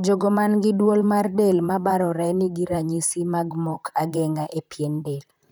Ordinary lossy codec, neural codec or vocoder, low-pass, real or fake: none; vocoder, 44.1 kHz, 128 mel bands, Pupu-Vocoder; none; fake